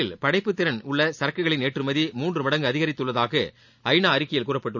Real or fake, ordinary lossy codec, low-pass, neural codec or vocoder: real; none; 7.2 kHz; none